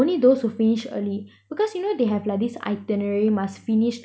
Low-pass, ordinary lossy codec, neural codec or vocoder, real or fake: none; none; none; real